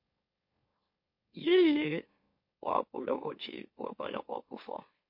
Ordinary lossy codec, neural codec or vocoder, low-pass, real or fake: MP3, 32 kbps; autoencoder, 44.1 kHz, a latent of 192 numbers a frame, MeloTTS; 5.4 kHz; fake